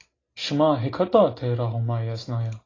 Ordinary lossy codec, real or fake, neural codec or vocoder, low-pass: AAC, 32 kbps; real; none; 7.2 kHz